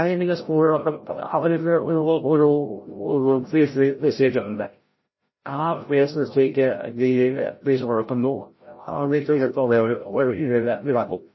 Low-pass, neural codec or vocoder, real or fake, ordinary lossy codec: 7.2 kHz; codec, 16 kHz, 0.5 kbps, FreqCodec, larger model; fake; MP3, 24 kbps